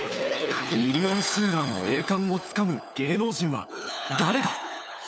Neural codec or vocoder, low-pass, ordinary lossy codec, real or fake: codec, 16 kHz, 4 kbps, FunCodec, trained on LibriTTS, 50 frames a second; none; none; fake